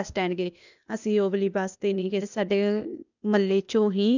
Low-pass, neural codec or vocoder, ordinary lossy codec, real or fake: 7.2 kHz; codec, 16 kHz, 0.8 kbps, ZipCodec; none; fake